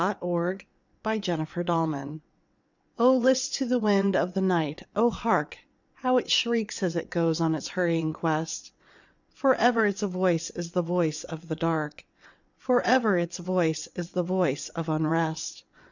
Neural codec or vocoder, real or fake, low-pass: vocoder, 22.05 kHz, 80 mel bands, WaveNeXt; fake; 7.2 kHz